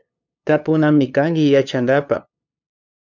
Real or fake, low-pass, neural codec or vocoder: fake; 7.2 kHz; codec, 16 kHz, 2 kbps, FunCodec, trained on LibriTTS, 25 frames a second